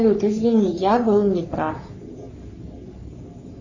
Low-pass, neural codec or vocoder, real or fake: 7.2 kHz; codec, 44.1 kHz, 3.4 kbps, Pupu-Codec; fake